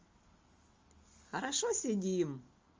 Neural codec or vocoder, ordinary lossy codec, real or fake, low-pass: none; Opus, 32 kbps; real; 7.2 kHz